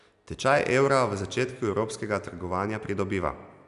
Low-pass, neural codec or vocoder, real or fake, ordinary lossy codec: 10.8 kHz; none; real; none